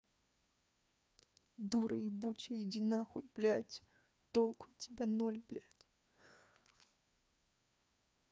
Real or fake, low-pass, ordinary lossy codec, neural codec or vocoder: fake; none; none; codec, 16 kHz, 2 kbps, FreqCodec, larger model